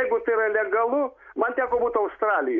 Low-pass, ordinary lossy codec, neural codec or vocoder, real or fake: 7.2 kHz; MP3, 96 kbps; none; real